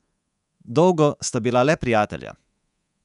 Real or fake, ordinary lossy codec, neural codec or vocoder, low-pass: fake; none; codec, 24 kHz, 3.1 kbps, DualCodec; 10.8 kHz